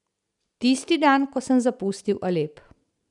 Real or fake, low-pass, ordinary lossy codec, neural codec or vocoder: real; 10.8 kHz; none; none